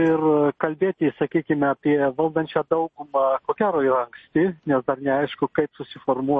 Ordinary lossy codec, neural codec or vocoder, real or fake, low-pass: MP3, 32 kbps; none; real; 9.9 kHz